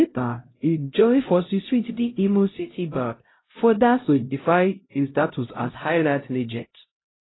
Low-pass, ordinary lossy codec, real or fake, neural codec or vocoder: 7.2 kHz; AAC, 16 kbps; fake; codec, 16 kHz, 0.5 kbps, X-Codec, HuBERT features, trained on LibriSpeech